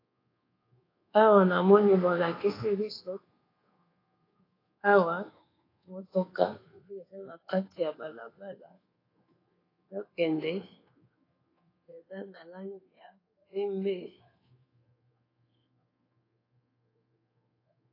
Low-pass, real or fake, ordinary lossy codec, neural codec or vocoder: 5.4 kHz; fake; AAC, 24 kbps; codec, 24 kHz, 1.2 kbps, DualCodec